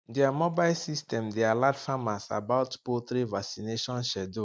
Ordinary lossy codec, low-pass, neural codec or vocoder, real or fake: none; none; none; real